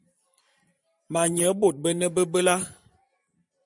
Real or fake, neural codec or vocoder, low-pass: fake; vocoder, 44.1 kHz, 128 mel bands every 512 samples, BigVGAN v2; 10.8 kHz